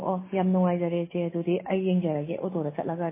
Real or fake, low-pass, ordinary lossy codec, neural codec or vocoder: real; 3.6 kHz; MP3, 16 kbps; none